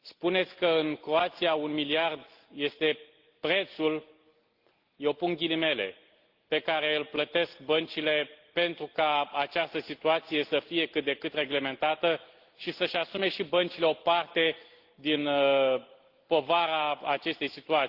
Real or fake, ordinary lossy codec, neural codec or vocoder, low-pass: real; Opus, 16 kbps; none; 5.4 kHz